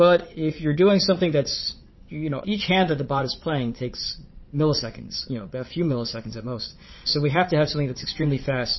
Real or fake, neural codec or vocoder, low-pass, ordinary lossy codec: fake; vocoder, 22.05 kHz, 80 mel bands, Vocos; 7.2 kHz; MP3, 24 kbps